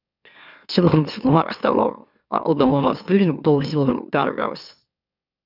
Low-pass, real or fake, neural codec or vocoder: 5.4 kHz; fake; autoencoder, 44.1 kHz, a latent of 192 numbers a frame, MeloTTS